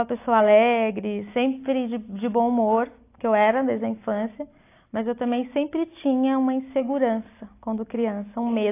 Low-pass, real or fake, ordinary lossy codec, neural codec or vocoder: 3.6 kHz; real; AAC, 24 kbps; none